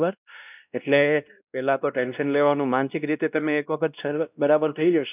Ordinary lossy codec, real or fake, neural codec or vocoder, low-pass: none; fake; codec, 16 kHz, 1 kbps, X-Codec, WavLM features, trained on Multilingual LibriSpeech; 3.6 kHz